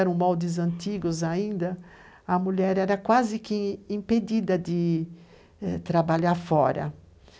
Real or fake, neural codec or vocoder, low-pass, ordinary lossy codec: real; none; none; none